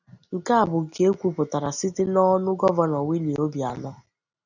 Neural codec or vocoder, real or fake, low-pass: none; real; 7.2 kHz